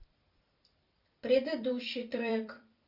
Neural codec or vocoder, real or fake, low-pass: vocoder, 44.1 kHz, 128 mel bands every 256 samples, BigVGAN v2; fake; 5.4 kHz